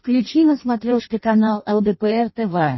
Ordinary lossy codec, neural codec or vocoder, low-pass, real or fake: MP3, 24 kbps; codec, 16 kHz in and 24 kHz out, 0.6 kbps, FireRedTTS-2 codec; 7.2 kHz; fake